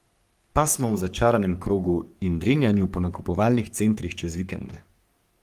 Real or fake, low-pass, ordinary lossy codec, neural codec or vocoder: fake; 14.4 kHz; Opus, 32 kbps; codec, 44.1 kHz, 3.4 kbps, Pupu-Codec